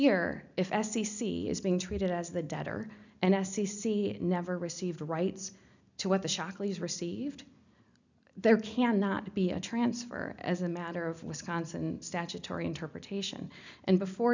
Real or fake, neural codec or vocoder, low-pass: real; none; 7.2 kHz